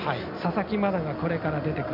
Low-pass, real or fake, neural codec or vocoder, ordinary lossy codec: 5.4 kHz; real; none; none